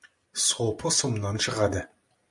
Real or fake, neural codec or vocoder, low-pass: real; none; 10.8 kHz